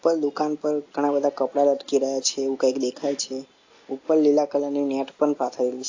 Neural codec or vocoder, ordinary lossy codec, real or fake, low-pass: none; AAC, 48 kbps; real; 7.2 kHz